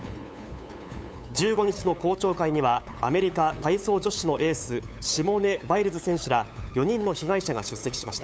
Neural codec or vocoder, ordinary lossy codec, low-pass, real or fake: codec, 16 kHz, 8 kbps, FunCodec, trained on LibriTTS, 25 frames a second; none; none; fake